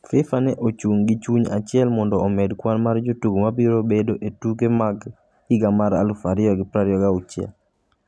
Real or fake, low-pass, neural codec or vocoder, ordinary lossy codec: real; none; none; none